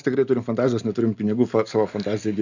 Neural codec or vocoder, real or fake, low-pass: none; real; 7.2 kHz